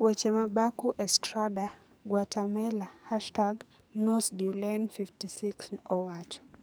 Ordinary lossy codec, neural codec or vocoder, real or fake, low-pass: none; codec, 44.1 kHz, 2.6 kbps, SNAC; fake; none